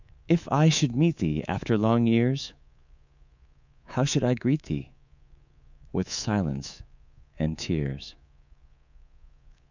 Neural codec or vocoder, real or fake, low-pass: codec, 24 kHz, 3.1 kbps, DualCodec; fake; 7.2 kHz